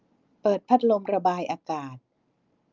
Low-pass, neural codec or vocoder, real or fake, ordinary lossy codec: 7.2 kHz; none; real; Opus, 24 kbps